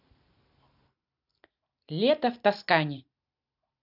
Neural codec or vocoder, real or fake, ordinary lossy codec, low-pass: none; real; none; 5.4 kHz